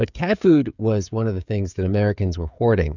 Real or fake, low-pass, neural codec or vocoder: fake; 7.2 kHz; codec, 16 kHz, 16 kbps, FreqCodec, smaller model